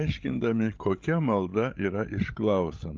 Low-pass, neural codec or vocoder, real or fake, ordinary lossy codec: 7.2 kHz; codec, 16 kHz, 16 kbps, FreqCodec, larger model; fake; Opus, 32 kbps